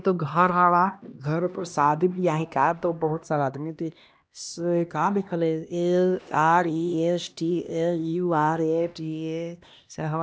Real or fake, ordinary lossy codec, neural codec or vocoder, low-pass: fake; none; codec, 16 kHz, 1 kbps, X-Codec, HuBERT features, trained on LibriSpeech; none